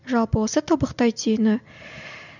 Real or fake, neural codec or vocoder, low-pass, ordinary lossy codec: real; none; 7.2 kHz; none